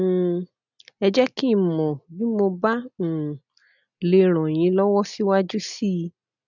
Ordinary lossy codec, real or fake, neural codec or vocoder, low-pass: none; real; none; 7.2 kHz